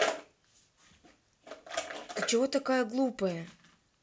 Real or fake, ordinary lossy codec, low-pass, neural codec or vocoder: real; none; none; none